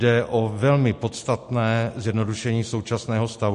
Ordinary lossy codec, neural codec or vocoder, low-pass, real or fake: MP3, 48 kbps; none; 14.4 kHz; real